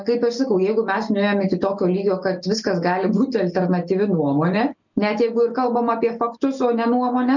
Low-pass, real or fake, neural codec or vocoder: 7.2 kHz; real; none